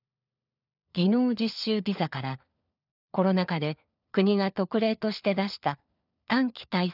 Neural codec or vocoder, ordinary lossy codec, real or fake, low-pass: codec, 16 kHz, 4 kbps, FunCodec, trained on LibriTTS, 50 frames a second; none; fake; 5.4 kHz